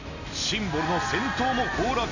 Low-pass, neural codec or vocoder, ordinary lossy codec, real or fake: 7.2 kHz; none; none; real